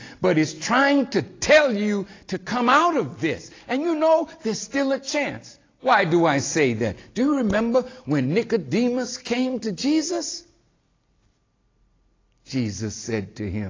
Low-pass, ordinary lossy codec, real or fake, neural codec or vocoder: 7.2 kHz; AAC, 32 kbps; real; none